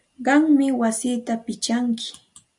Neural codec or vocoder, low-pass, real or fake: none; 10.8 kHz; real